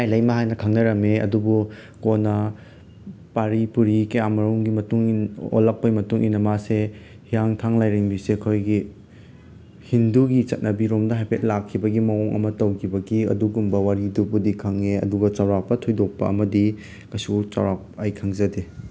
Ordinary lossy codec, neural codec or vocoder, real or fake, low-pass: none; none; real; none